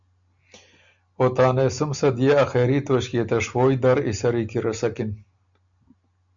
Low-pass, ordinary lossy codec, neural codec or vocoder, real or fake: 7.2 kHz; MP3, 64 kbps; none; real